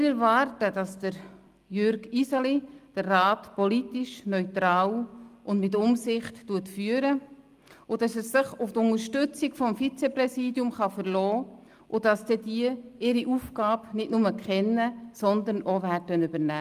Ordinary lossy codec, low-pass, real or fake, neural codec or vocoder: Opus, 32 kbps; 14.4 kHz; real; none